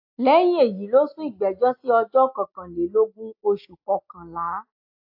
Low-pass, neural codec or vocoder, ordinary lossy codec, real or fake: 5.4 kHz; none; AAC, 48 kbps; real